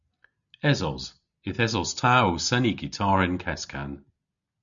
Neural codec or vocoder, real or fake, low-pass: none; real; 7.2 kHz